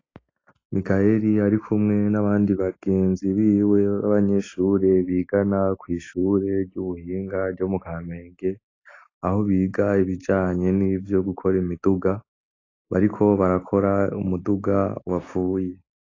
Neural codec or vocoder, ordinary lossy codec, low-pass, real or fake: none; AAC, 32 kbps; 7.2 kHz; real